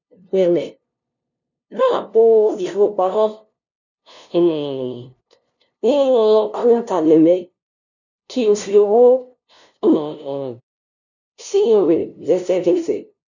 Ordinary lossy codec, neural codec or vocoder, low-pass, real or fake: none; codec, 16 kHz, 0.5 kbps, FunCodec, trained on LibriTTS, 25 frames a second; 7.2 kHz; fake